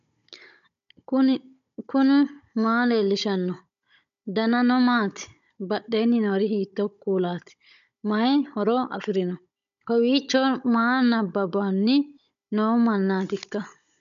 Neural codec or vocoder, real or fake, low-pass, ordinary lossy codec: codec, 16 kHz, 16 kbps, FunCodec, trained on Chinese and English, 50 frames a second; fake; 7.2 kHz; MP3, 96 kbps